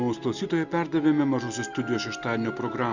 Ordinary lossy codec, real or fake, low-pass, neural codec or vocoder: Opus, 64 kbps; real; 7.2 kHz; none